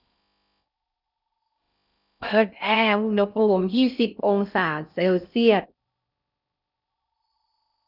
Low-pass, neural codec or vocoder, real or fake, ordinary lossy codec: 5.4 kHz; codec, 16 kHz in and 24 kHz out, 0.6 kbps, FocalCodec, streaming, 4096 codes; fake; none